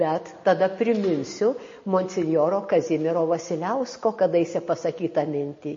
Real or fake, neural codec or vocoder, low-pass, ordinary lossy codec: real; none; 7.2 kHz; MP3, 32 kbps